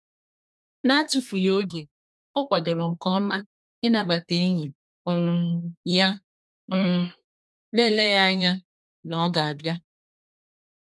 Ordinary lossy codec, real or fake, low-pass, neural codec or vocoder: none; fake; none; codec, 24 kHz, 1 kbps, SNAC